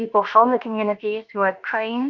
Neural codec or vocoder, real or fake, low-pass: codec, 16 kHz, about 1 kbps, DyCAST, with the encoder's durations; fake; 7.2 kHz